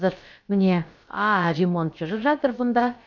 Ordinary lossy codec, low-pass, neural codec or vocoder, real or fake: none; 7.2 kHz; codec, 16 kHz, about 1 kbps, DyCAST, with the encoder's durations; fake